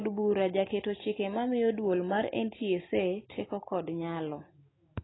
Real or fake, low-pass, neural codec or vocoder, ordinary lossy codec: real; 7.2 kHz; none; AAC, 16 kbps